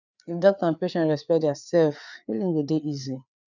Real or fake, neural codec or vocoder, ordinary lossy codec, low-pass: fake; codec, 16 kHz, 4 kbps, FreqCodec, larger model; none; 7.2 kHz